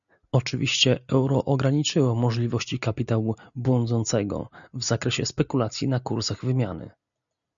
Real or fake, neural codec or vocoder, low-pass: real; none; 7.2 kHz